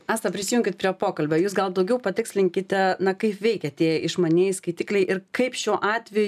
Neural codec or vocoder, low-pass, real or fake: none; 14.4 kHz; real